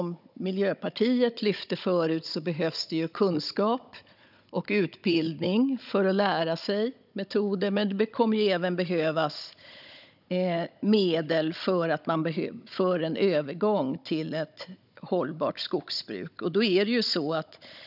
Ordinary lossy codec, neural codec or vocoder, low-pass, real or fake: none; codec, 16 kHz, 16 kbps, FunCodec, trained on Chinese and English, 50 frames a second; 5.4 kHz; fake